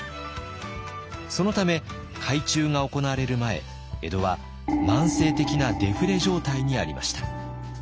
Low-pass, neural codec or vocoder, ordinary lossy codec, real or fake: none; none; none; real